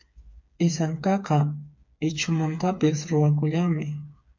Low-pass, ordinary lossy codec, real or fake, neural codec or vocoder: 7.2 kHz; MP3, 48 kbps; fake; codec, 16 kHz, 4 kbps, FreqCodec, smaller model